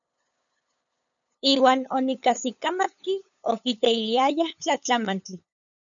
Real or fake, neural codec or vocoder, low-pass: fake; codec, 16 kHz, 8 kbps, FunCodec, trained on LibriTTS, 25 frames a second; 7.2 kHz